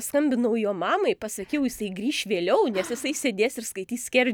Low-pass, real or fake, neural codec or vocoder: 19.8 kHz; real; none